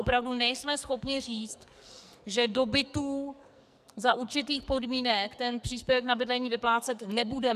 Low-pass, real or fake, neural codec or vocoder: 14.4 kHz; fake; codec, 44.1 kHz, 2.6 kbps, SNAC